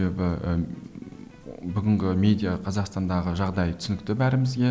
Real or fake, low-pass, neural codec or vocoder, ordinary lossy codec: real; none; none; none